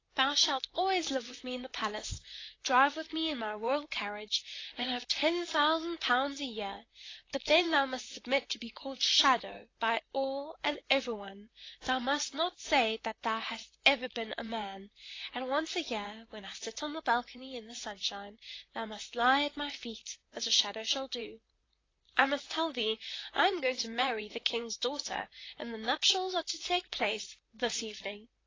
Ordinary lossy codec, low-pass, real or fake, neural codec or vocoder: AAC, 32 kbps; 7.2 kHz; fake; vocoder, 44.1 kHz, 128 mel bands, Pupu-Vocoder